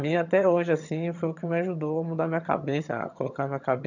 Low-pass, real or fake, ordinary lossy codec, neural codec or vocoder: 7.2 kHz; fake; none; vocoder, 22.05 kHz, 80 mel bands, HiFi-GAN